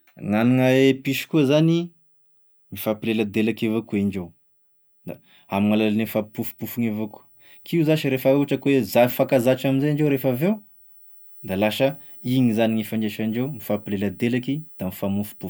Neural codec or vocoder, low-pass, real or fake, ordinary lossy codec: none; none; real; none